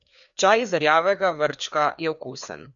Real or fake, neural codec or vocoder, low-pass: fake; codec, 16 kHz, 2 kbps, FreqCodec, larger model; 7.2 kHz